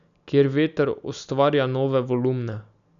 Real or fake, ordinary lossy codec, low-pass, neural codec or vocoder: real; none; 7.2 kHz; none